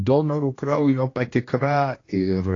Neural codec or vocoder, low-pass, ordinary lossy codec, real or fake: codec, 16 kHz, 1 kbps, X-Codec, HuBERT features, trained on general audio; 7.2 kHz; AAC, 32 kbps; fake